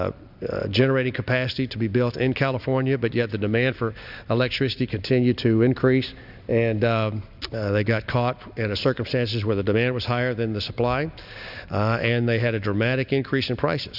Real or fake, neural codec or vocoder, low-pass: real; none; 5.4 kHz